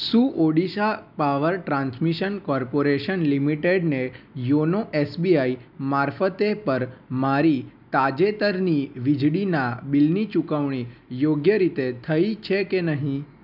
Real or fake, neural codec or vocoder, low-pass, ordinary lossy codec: real; none; 5.4 kHz; none